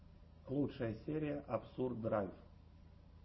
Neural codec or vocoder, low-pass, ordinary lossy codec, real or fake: none; 7.2 kHz; MP3, 24 kbps; real